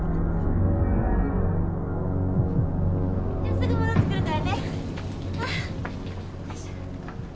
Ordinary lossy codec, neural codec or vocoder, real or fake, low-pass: none; none; real; none